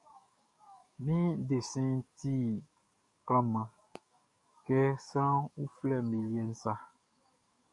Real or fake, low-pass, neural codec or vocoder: fake; 10.8 kHz; codec, 44.1 kHz, 7.8 kbps, DAC